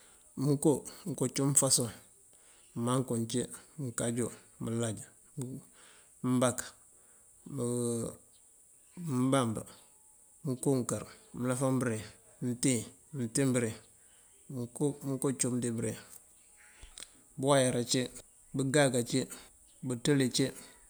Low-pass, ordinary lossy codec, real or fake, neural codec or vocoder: none; none; real; none